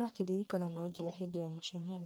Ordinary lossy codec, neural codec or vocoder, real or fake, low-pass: none; codec, 44.1 kHz, 1.7 kbps, Pupu-Codec; fake; none